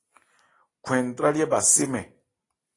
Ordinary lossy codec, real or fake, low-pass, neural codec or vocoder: AAC, 32 kbps; real; 10.8 kHz; none